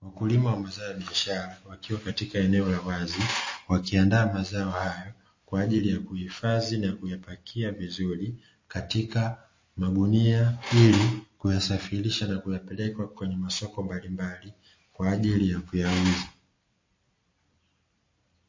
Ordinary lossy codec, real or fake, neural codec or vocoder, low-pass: MP3, 32 kbps; real; none; 7.2 kHz